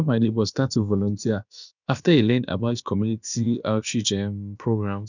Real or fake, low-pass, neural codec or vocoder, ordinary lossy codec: fake; 7.2 kHz; codec, 16 kHz, about 1 kbps, DyCAST, with the encoder's durations; none